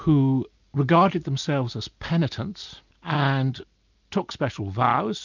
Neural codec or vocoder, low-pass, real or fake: none; 7.2 kHz; real